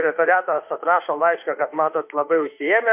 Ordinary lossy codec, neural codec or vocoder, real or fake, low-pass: MP3, 32 kbps; autoencoder, 48 kHz, 32 numbers a frame, DAC-VAE, trained on Japanese speech; fake; 3.6 kHz